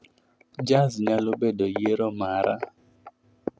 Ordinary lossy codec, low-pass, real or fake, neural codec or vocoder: none; none; real; none